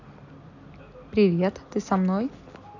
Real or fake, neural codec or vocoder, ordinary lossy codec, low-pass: real; none; none; 7.2 kHz